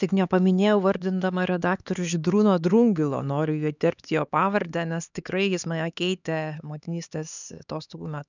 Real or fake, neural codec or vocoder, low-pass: fake; codec, 16 kHz, 4 kbps, X-Codec, HuBERT features, trained on LibriSpeech; 7.2 kHz